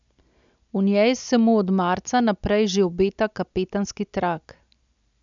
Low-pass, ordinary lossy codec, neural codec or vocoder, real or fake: 7.2 kHz; none; none; real